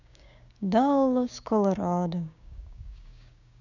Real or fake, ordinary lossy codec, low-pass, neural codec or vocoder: fake; none; 7.2 kHz; codec, 16 kHz, 6 kbps, DAC